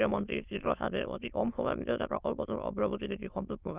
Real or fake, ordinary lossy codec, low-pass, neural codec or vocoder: fake; Opus, 24 kbps; 3.6 kHz; autoencoder, 22.05 kHz, a latent of 192 numbers a frame, VITS, trained on many speakers